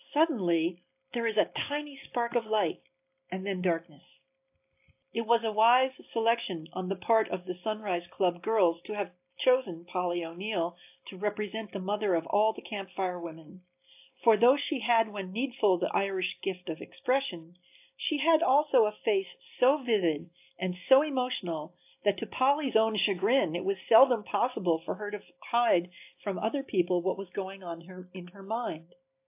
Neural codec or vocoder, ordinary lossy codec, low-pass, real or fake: none; AAC, 32 kbps; 3.6 kHz; real